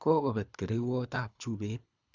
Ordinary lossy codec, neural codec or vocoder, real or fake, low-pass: Opus, 64 kbps; codec, 24 kHz, 3 kbps, HILCodec; fake; 7.2 kHz